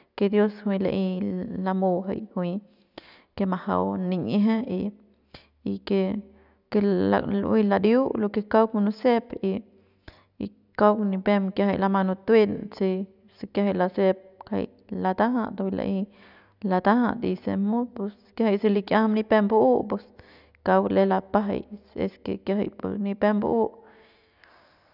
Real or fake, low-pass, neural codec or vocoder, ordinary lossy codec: real; 5.4 kHz; none; none